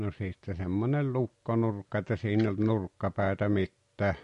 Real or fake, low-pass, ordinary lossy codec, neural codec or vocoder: real; 10.8 kHz; MP3, 48 kbps; none